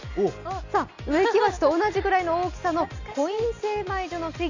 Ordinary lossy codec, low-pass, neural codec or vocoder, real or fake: Opus, 64 kbps; 7.2 kHz; none; real